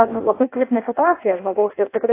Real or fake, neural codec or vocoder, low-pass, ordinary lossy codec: fake; codec, 16 kHz in and 24 kHz out, 0.6 kbps, FireRedTTS-2 codec; 3.6 kHz; AAC, 24 kbps